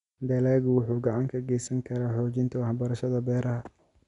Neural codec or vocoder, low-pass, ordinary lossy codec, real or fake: none; 10.8 kHz; none; real